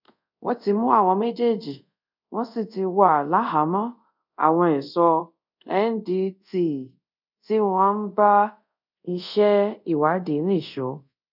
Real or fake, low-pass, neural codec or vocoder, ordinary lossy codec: fake; 5.4 kHz; codec, 24 kHz, 0.5 kbps, DualCodec; none